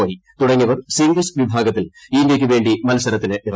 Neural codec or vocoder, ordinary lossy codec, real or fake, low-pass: none; none; real; none